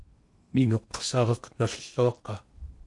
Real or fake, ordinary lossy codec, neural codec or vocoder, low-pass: fake; MP3, 48 kbps; codec, 16 kHz in and 24 kHz out, 0.8 kbps, FocalCodec, streaming, 65536 codes; 10.8 kHz